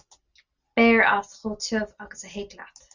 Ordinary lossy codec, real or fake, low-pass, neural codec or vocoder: Opus, 64 kbps; real; 7.2 kHz; none